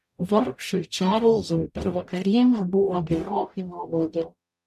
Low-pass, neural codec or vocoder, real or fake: 14.4 kHz; codec, 44.1 kHz, 0.9 kbps, DAC; fake